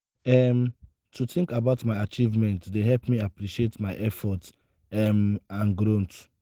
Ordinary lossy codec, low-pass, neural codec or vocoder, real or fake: Opus, 16 kbps; 19.8 kHz; none; real